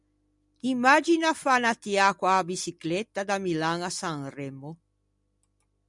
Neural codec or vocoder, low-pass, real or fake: none; 10.8 kHz; real